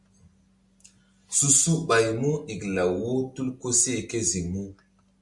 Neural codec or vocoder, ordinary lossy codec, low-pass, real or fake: none; MP3, 64 kbps; 10.8 kHz; real